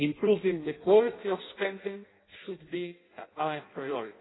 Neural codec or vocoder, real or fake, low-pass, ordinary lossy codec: codec, 16 kHz in and 24 kHz out, 0.6 kbps, FireRedTTS-2 codec; fake; 7.2 kHz; AAC, 16 kbps